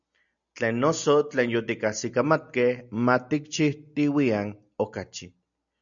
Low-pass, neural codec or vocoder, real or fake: 7.2 kHz; none; real